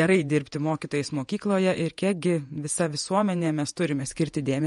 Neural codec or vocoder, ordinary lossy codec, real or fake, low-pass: vocoder, 48 kHz, 128 mel bands, Vocos; MP3, 48 kbps; fake; 19.8 kHz